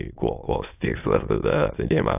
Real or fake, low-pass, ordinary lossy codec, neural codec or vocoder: fake; 3.6 kHz; AAC, 32 kbps; autoencoder, 22.05 kHz, a latent of 192 numbers a frame, VITS, trained on many speakers